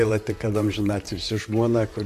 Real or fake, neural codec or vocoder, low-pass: fake; vocoder, 44.1 kHz, 128 mel bands, Pupu-Vocoder; 14.4 kHz